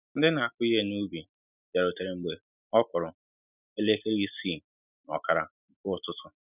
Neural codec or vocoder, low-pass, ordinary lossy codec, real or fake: none; 3.6 kHz; none; real